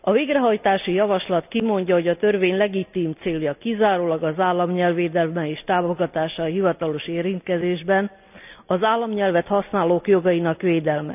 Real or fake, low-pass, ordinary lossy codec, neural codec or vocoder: real; 3.6 kHz; none; none